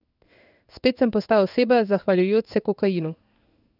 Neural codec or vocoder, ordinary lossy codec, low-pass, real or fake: codec, 16 kHz in and 24 kHz out, 1 kbps, XY-Tokenizer; none; 5.4 kHz; fake